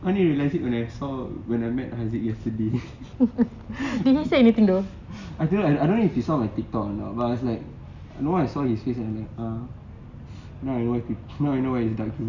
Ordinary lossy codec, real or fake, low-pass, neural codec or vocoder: Opus, 64 kbps; real; 7.2 kHz; none